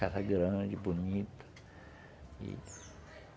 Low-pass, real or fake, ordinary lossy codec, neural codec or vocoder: none; real; none; none